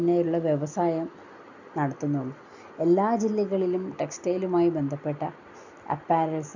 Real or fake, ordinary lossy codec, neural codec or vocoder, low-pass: real; none; none; 7.2 kHz